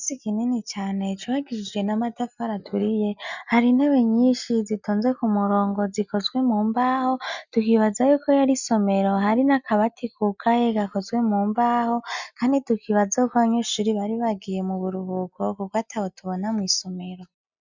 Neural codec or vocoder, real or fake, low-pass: none; real; 7.2 kHz